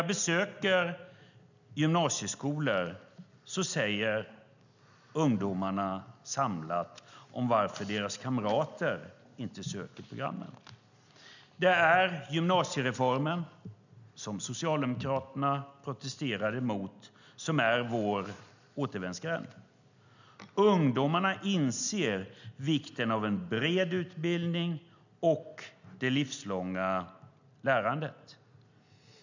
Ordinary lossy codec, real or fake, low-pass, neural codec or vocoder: none; real; 7.2 kHz; none